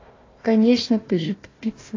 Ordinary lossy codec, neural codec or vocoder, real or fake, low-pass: AAC, 32 kbps; codec, 16 kHz, 1 kbps, FunCodec, trained on Chinese and English, 50 frames a second; fake; 7.2 kHz